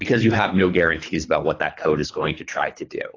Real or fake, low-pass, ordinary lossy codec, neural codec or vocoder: fake; 7.2 kHz; AAC, 32 kbps; codec, 24 kHz, 3 kbps, HILCodec